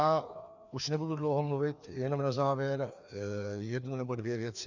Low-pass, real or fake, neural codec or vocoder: 7.2 kHz; fake; codec, 16 kHz, 2 kbps, FreqCodec, larger model